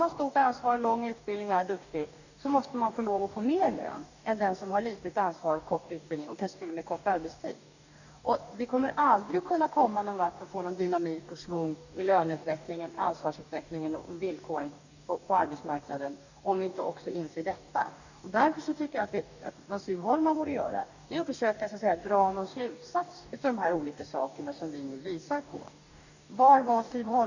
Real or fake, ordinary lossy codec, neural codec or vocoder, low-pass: fake; none; codec, 44.1 kHz, 2.6 kbps, DAC; 7.2 kHz